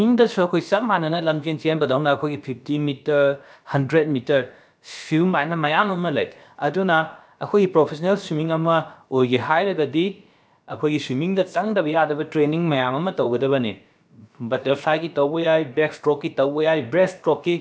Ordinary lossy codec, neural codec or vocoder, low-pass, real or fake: none; codec, 16 kHz, about 1 kbps, DyCAST, with the encoder's durations; none; fake